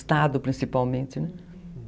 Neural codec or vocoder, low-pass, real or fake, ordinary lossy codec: none; none; real; none